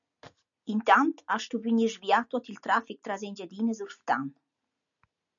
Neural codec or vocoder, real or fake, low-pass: none; real; 7.2 kHz